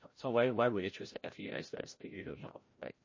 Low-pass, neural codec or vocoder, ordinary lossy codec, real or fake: 7.2 kHz; codec, 16 kHz, 0.5 kbps, FreqCodec, larger model; MP3, 32 kbps; fake